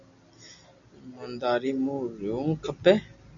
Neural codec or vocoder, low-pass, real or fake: none; 7.2 kHz; real